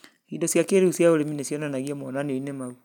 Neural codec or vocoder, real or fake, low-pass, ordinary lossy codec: none; real; 19.8 kHz; none